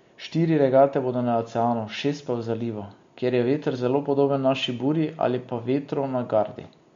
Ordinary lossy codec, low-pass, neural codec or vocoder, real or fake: MP3, 48 kbps; 7.2 kHz; none; real